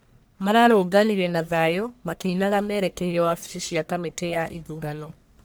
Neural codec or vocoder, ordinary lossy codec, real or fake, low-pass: codec, 44.1 kHz, 1.7 kbps, Pupu-Codec; none; fake; none